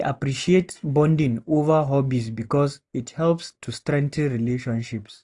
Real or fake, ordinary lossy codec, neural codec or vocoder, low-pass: real; AAC, 64 kbps; none; 10.8 kHz